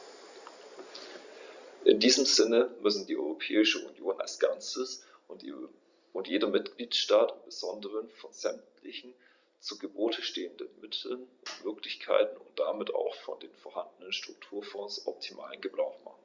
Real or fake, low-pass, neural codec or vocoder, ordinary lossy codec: real; 7.2 kHz; none; Opus, 64 kbps